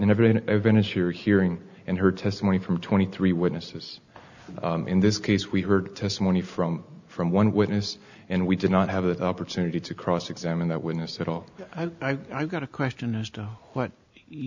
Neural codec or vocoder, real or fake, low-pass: none; real; 7.2 kHz